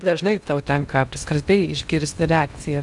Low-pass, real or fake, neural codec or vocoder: 10.8 kHz; fake; codec, 16 kHz in and 24 kHz out, 0.6 kbps, FocalCodec, streaming, 2048 codes